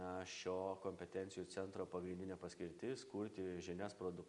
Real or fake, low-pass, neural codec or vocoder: real; 10.8 kHz; none